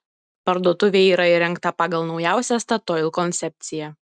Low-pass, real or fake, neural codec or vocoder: 9.9 kHz; real; none